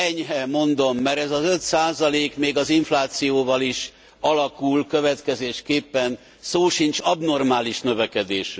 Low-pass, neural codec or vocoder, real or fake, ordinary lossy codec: none; none; real; none